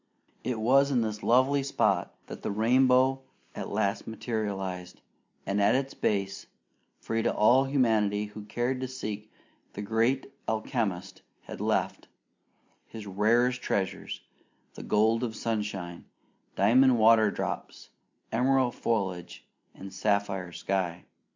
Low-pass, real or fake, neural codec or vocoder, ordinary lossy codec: 7.2 kHz; real; none; MP3, 64 kbps